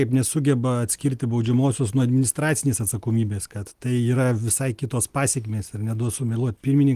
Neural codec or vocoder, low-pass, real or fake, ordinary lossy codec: none; 14.4 kHz; real; Opus, 24 kbps